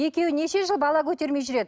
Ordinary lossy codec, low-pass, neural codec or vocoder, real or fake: none; none; none; real